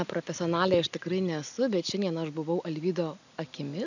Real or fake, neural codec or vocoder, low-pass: real; none; 7.2 kHz